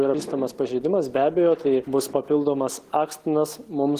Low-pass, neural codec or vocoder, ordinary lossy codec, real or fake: 14.4 kHz; none; Opus, 16 kbps; real